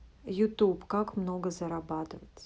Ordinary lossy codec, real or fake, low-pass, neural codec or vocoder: none; real; none; none